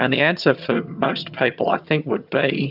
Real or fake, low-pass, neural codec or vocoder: fake; 5.4 kHz; vocoder, 22.05 kHz, 80 mel bands, HiFi-GAN